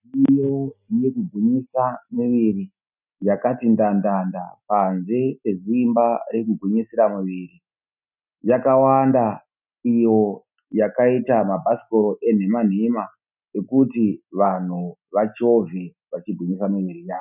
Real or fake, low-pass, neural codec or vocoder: real; 3.6 kHz; none